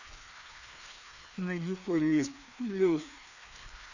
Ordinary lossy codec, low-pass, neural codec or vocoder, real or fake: none; 7.2 kHz; codec, 16 kHz, 2 kbps, FreqCodec, larger model; fake